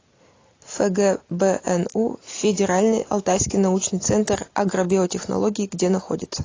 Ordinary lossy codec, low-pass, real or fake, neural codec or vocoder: AAC, 32 kbps; 7.2 kHz; real; none